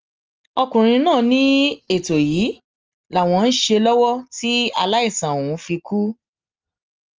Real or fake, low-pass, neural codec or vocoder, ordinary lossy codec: real; 7.2 kHz; none; Opus, 24 kbps